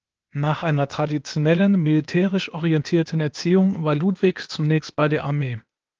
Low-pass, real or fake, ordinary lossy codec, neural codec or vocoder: 7.2 kHz; fake; Opus, 24 kbps; codec, 16 kHz, 0.8 kbps, ZipCodec